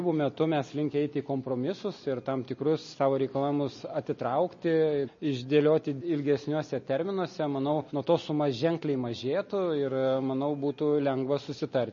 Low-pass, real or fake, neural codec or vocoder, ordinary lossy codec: 7.2 kHz; real; none; MP3, 32 kbps